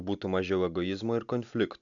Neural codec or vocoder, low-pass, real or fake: none; 7.2 kHz; real